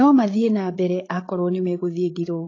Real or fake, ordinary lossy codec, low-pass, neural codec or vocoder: fake; AAC, 32 kbps; 7.2 kHz; codec, 16 kHz, 8 kbps, FreqCodec, larger model